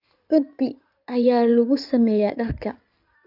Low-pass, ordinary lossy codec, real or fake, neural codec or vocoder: 5.4 kHz; none; fake; codec, 16 kHz in and 24 kHz out, 2.2 kbps, FireRedTTS-2 codec